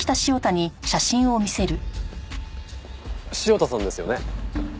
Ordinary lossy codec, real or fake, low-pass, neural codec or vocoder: none; real; none; none